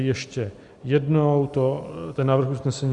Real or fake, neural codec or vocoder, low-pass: real; none; 10.8 kHz